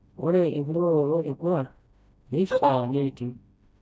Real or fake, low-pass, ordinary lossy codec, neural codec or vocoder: fake; none; none; codec, 16 kHz, 1 kbps, FreqCodec, smaller model